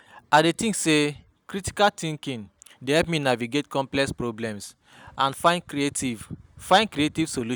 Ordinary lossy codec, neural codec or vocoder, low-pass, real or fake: none; none; none; real